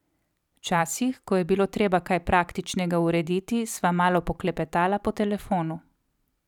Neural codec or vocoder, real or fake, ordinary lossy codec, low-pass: vocoder, 44.1 kHz, 128 mel bands every 256 samples, BigVGAN v2; fake; none; 19.8 kHz